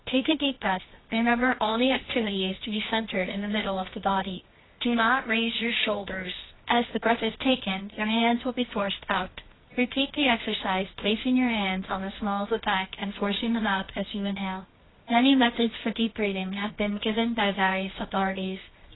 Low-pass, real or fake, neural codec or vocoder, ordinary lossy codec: 7.2 kHz; fake; codec, 24 kHz, 0.9 kbps, WavTokenizer, medium music audio release; AAC, 16 kbps